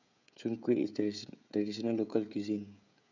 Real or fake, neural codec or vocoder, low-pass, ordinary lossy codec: fake; codec, 16 kHz, 16 kbps, FreqCodec, smaller model; 7.2 kHz; none